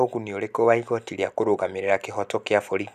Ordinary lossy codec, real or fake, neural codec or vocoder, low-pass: none; real; none; 14.4 kHz